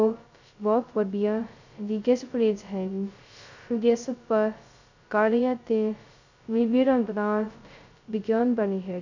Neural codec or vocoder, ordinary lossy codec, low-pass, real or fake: codec, 16 kHz, 0.2 kbps, FocalCodec; none; 7.2 kHz; fake